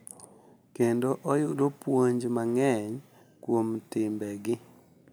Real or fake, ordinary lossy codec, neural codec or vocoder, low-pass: real; none; none; none